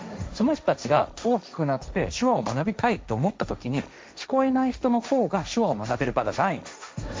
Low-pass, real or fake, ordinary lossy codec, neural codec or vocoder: none; fake; none; codec, 16 kHz, 1.1 kbps, Voila-Tokenizer